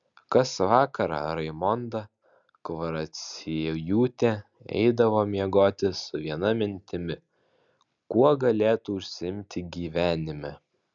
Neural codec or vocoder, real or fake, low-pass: none; real; 7.2 kHz